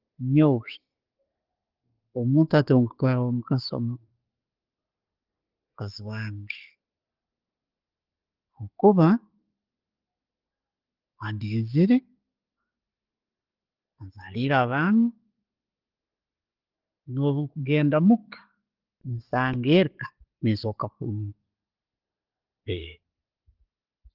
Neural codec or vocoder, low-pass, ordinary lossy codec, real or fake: none; 5.4 kHz; Opus, 16 kbps; real